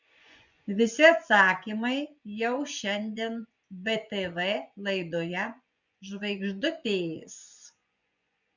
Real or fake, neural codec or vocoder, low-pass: fake; vocoder, 24 kHz, 100 mel bands, Vocos; 7.2 kHz